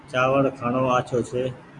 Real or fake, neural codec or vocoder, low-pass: real; none; 10.8 kHz